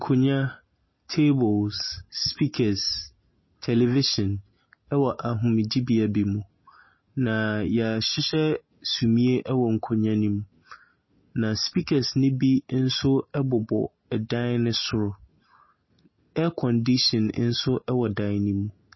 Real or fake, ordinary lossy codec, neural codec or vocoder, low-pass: real; MP3, 24 kbps; none; 7.2 kHz